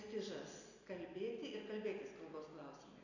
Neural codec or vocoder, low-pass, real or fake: none; 7.2 kHz; real